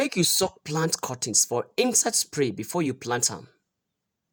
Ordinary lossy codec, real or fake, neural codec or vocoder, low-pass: none; fake; vocoder, 48 kHz, 128 mel bands, Vocos; none